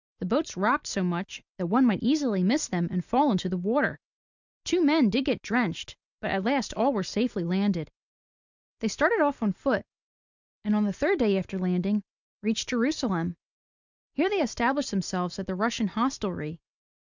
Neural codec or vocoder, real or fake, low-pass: none; real; 7.2 kHz